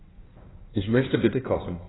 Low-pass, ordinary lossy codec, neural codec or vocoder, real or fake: 7.2 kHz; AAC, 16 kbps; codec, 16 kHz in and 24 kHz out, 1.1 kbps, FireRedTTS-2 codec; fake